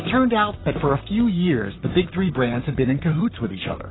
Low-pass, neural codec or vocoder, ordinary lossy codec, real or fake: 7.2 kHz; vocoder, 44.1 kHz, 128 mel bands, Pupu-Vocoder; AAC, 16 kbps; fake